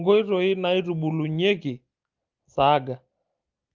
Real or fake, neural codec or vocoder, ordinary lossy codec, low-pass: real; none; Opus, 32 kbps; 7.2 kHz